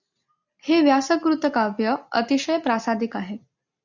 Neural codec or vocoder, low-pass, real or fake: none; 7.2 kHz; real